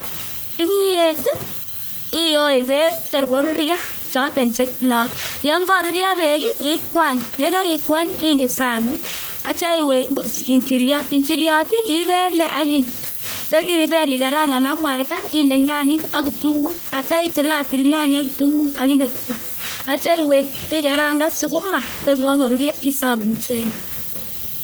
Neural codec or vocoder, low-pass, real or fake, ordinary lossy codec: codec, 44.1 kHz, 1.7 kbps, Pupu-Codec; none; fake; none